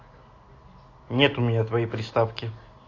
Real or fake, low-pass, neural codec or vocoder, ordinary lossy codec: real; 7.2 kHz; none; AAC, 32 kbps